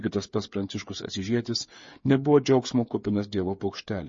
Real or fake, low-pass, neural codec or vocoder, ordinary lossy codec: fake; 7.2 kHz; codec, 16 kHz, 8 kbps, FreqCodec, smaller model; MP3, 32 kbps